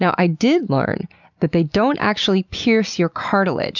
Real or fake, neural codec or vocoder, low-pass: real; none; 7.2 kHz